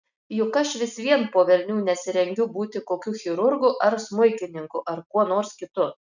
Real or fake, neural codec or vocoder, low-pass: real; none; 7.2 kHz